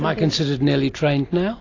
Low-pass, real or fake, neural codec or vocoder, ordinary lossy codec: 7.2 kHz; real; none; AAC, 32 kbps